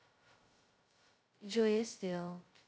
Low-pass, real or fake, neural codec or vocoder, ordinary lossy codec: none; fake; codec, 16 kHz, 0.2 kbps, FocalCodec; none